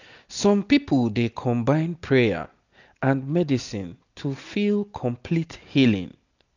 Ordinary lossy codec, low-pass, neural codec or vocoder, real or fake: none; 7.2 kHz; none; real